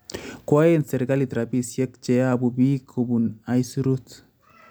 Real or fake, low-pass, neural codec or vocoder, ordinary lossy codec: real; none; none; none